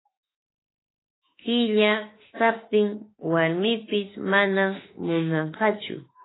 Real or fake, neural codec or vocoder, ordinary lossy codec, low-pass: fake; autoencoder, 48 kHz, 32 numbers a frame, DAC-VAE, trained on Japanese speech; AAC, 16 kbps; 7.2 kHz